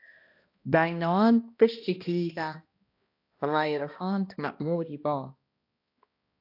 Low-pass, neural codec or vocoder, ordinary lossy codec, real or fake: 5.4 kHz; codec, 16 kHz, 1 kbps, X-Codec, HuBERT features, trained on balanced general audio; AAC, 32 kbps; fake